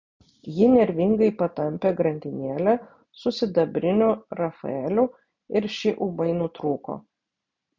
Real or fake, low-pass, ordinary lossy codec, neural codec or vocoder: real; 7.2 kHz; MP3, 64 kbps; none